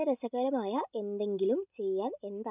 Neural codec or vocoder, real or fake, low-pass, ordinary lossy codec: none; real; 3.6 kHz; none